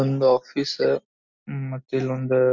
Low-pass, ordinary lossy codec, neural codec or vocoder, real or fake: 7.2 kHz; MP3, 64 kbps; none; real